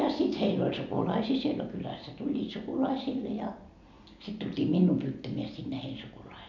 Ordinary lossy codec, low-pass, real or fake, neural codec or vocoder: none; 7.2 kHz; real; none